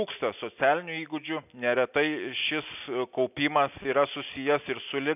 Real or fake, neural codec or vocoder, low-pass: real; none; 3.6 kHz